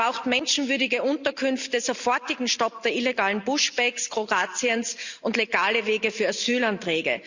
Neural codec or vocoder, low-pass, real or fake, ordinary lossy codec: none; 7.2 kHz; real; Opus, 64 kbps